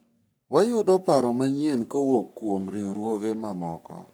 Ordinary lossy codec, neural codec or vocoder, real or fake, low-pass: none; codec, 44.1 kHz, 3.4 kbps, Pupu-Codec; fake; none